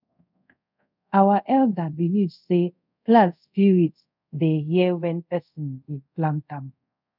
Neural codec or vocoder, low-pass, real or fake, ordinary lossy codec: codec, 24 kHz, 0.5 kbps, DualCodec; 5.4 kHz; fake; none